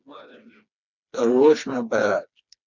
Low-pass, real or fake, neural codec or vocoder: 7.2 kHz; fake; codec, 16 kHz, 2 kbps, FreqCodec, smaller model